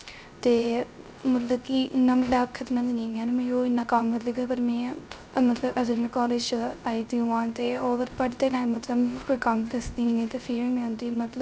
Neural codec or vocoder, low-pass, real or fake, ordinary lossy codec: codec, 16 kHz, 0.3 kbps, FocalCodec; none; fake; none